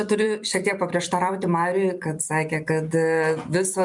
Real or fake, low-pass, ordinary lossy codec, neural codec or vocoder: fake; 10.8 kHz; MP3, 96 kbps; vocoder, 44.1 kHz, 128 mel bands every 256 samples, BigVGAN v2